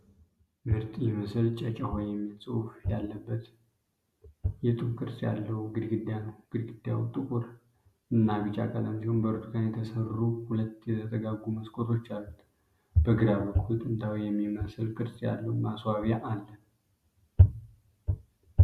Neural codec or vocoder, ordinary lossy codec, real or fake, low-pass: none; Opus, 64 kbps; real; 14.4 kHz